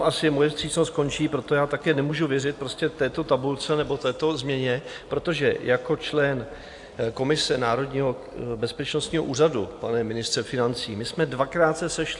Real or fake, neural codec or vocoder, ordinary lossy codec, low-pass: real; none; AAC, 64 kbps; 10.8 kHz